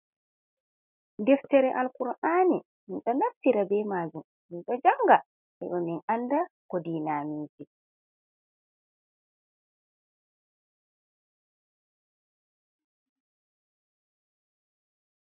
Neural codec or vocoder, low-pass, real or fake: none; 3.6 kHz; real